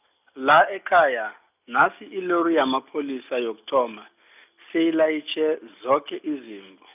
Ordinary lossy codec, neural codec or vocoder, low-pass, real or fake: none; none; 3.6 kHz; real